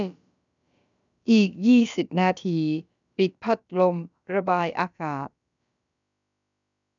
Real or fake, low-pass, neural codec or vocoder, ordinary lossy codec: fake; 7.2 kHz; codec, 16 kHz, about 1 kbps, DyCAST, with the encoder's durations; none